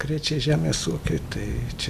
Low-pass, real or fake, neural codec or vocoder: 14.4 kHz; real; none